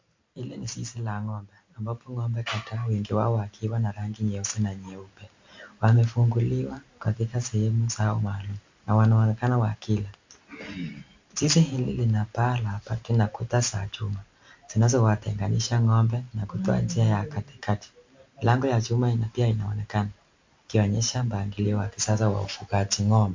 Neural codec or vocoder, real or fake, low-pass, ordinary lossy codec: none; real; 7.2 kHz; MP3, 48 kbps